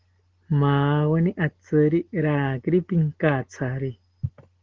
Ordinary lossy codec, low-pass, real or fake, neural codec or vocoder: Opus, 16 kbps; 7.2 kHz; real; none